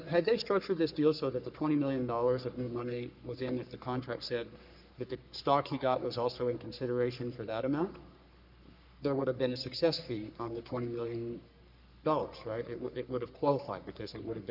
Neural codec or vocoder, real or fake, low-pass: codec, 44.1 kHz, 3.4 kbps, Pupu-Codec; fake; 5.4 kHz